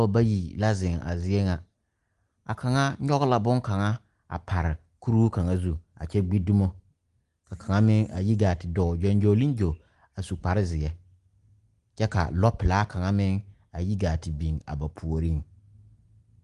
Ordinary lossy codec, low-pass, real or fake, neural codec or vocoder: Opus, 24 kbps; 10.8 kHz; real; none